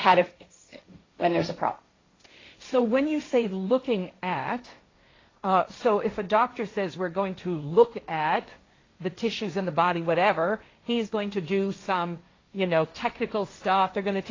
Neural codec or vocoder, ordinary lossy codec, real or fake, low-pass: codec, 16 kHz, 1.1 kbps, Voila-Tokenizer; AAC, 32 kbps; fake; 7.2 kHz